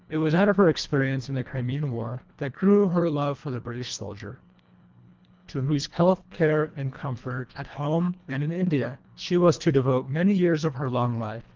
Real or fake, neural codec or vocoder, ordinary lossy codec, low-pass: fake; codec, 24 kHz, 1.5 kbps, HILCodec; Opus, 24 kbps; 7.2 kHz